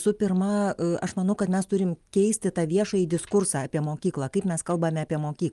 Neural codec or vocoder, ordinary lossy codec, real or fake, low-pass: none; Opus, 32 kbps; real; 10.8 kHz